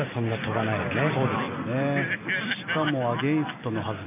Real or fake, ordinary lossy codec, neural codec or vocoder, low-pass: real; none; none; 3.6 kHz